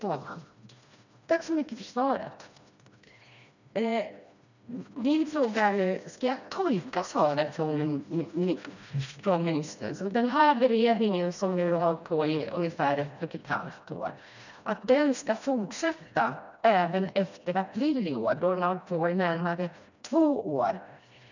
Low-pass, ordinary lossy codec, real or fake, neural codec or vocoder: 7.2 kHz; none; fake; codec, 16 kHz, 1 kbps, FreqCodec, smaller model